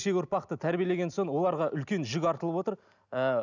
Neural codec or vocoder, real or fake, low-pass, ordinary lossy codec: none; real; 7.2 kHz; none